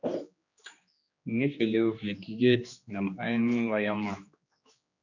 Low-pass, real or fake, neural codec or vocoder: 7.2 kHz; fake; codec, 16 kHz, 2 kbps, X-Codec, HuBERT features, trained on general audio